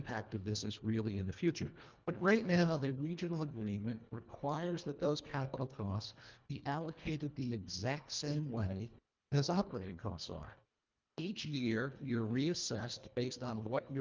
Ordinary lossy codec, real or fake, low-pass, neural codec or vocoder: Opus, 24 kbps; fake; 7.2 kHz; codec, 24 kHz, 1.5 kbps, HILCodec